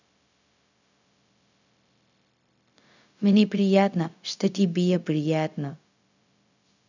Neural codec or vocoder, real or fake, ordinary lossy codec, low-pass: codec, 16 kHz, 0.4 kbps, LongCat-Audio-Codec; fake; none; 7.2 kHz